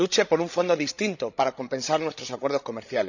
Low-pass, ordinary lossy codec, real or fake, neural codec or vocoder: 7.2 kHz; none; fake; codec, 16 kHz, 16 kbps, FreqCodec, larger model